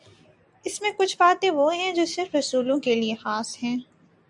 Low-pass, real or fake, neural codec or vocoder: 10.8 kHz; real; none